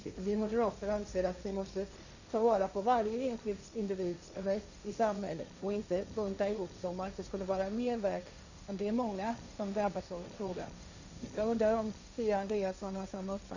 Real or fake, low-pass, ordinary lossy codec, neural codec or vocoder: fake; 7.2 kHz; none; codec, 16 kHz, 1.1 kbps, Voila-Tokenizer